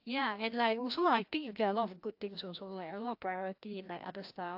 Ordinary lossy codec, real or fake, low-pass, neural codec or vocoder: AAC, 48 kbps; fake; 5.4 kHz; codec, 16 kHz, 1 kbps, FreqCodec, larger model